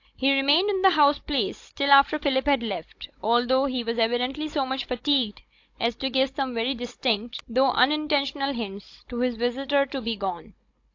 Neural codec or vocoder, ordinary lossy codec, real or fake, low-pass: codec, 16 kHz, 16 kbps, FunCodec, trained on Chinese and English, 50 frames a second; AAC, 48 kbps; fake; 7.2 kHz